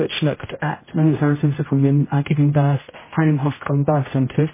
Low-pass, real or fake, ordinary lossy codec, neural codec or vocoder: 3.6 kHz; fake; MP3, 16 kbps; codec, 16 kHz, 0.5 kbps, X-Codec, HuBERT features, trained on general audio